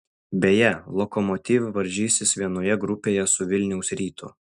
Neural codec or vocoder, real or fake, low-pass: none; real; 10.8 kHz